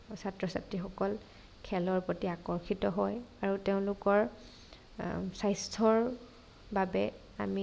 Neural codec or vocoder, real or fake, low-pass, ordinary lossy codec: none; real; none; none